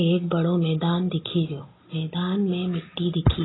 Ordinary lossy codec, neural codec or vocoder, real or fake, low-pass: AAC, 16 kbps; none; real; 7.2 kHz